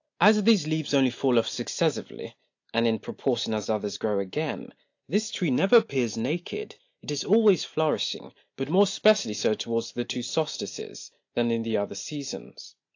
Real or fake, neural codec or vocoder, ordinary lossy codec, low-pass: real; none; AAC, 48 kbps; 7.2 kHz